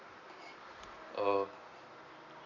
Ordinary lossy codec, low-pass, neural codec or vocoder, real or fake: none; 7.2 kHz; none; real